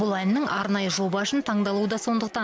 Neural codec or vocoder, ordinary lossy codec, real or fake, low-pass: none; none; real; none